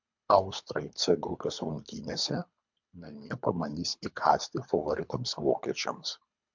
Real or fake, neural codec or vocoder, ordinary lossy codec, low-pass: fake; codec, 24 kHz, 3 kbps, HILCodec; MP3, 64 kbps; 7.2 kHz